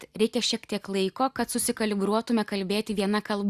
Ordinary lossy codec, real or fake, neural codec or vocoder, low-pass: Opus, 64 kbps; real; none; 14.4 kHz